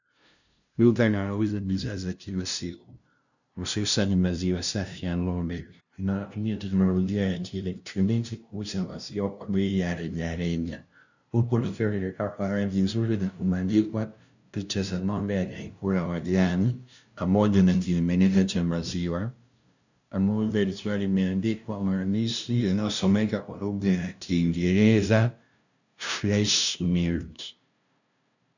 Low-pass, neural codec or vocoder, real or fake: 7.2 kHz; codec, 16 kHz, 0.5 kbps, FunCodec, trained on LibriTTS, 25 frames a second; fake